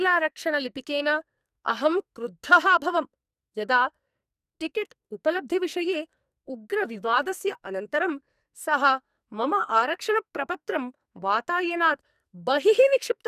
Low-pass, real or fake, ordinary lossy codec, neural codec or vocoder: 14.4 kHz; fake; none; codec, 44.1 kHz, 2.6 kbps, SNAC